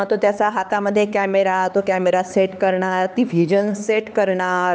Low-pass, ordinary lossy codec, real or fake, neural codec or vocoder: none; none; fake; codec, 16 kHz, 4 kbps, X-Codec, HuBERT features, trained on LibriSpeech